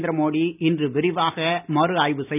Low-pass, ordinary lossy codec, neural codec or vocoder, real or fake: 3.6 kHz; none; none; real